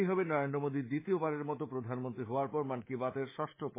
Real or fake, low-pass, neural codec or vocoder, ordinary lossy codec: fake; 3.6 kHz; codec, 24 kHz, 3.1 kbps, DualCodec; MP3, 16 kbps